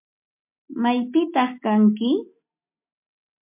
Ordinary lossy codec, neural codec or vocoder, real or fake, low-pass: MP3, 32 kbps; none; real; 3.6 kHz